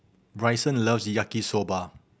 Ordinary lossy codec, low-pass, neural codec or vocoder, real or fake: none; none; none; real